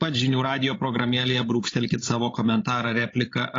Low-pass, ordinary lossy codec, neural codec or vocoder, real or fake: 7.2 kHz; AAC, 32 kbps; codec, 16 kHz, 16 kbps, FreqCodec, larger model; fake